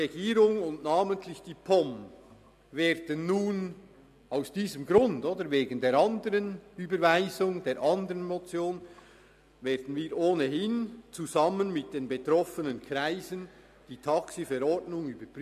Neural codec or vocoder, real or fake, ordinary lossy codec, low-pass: none; real; AAC, 96 kbps; 14.4 kHz